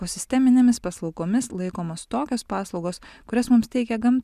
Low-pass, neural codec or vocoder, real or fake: 14.4 kHz; none; real